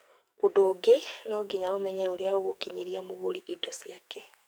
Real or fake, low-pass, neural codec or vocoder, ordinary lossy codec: fake; none; codec, 44.1 kHz, 2.6 kbps, SNAC; none